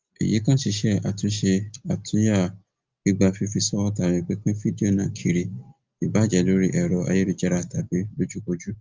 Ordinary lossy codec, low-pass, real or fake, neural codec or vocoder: Opus, 32 kbps; 7.2 kHz; real; none